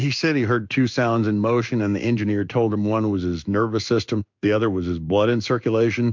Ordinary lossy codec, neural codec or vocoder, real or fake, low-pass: MP3, 64 kbps; codec, 16 kHz in and 24 kHz out, 1 kbps, XY-Tokenizer; fake; 7.2 kHz